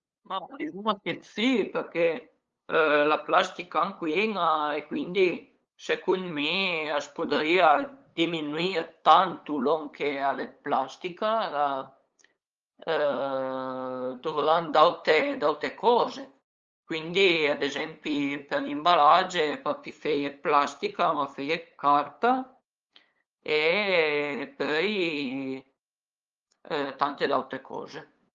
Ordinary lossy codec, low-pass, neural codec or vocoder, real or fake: Opus, 32 kbps; 7.2 kHz; codec, 16 kHz, 8 kbps, FunCodec, trained on LibriTTS, 25 frames a second; fake